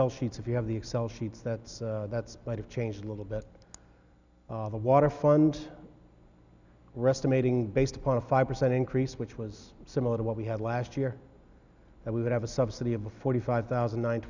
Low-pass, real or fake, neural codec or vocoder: 7.2 kHz; real; none